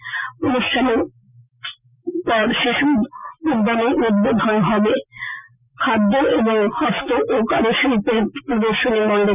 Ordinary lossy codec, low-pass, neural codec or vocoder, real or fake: none; 3.6 kHz; none; real